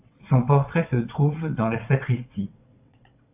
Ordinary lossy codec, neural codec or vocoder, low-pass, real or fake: AAC, 32 kbps; vocoder, 22.05 kHz, 80 mel bands, WaveNeXt; 3.6 kHz; fake